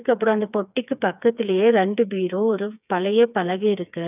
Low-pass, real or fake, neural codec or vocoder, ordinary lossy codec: 3.6 kHz; fake; codec, 16 kHz, 4 kbps, FreqCodec, smaller model; none